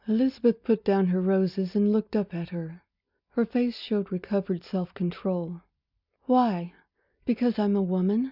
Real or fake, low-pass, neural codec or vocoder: real; 5.4 kHz; none